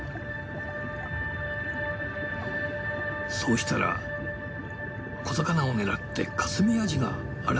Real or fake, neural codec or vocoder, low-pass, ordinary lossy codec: real; none; none; none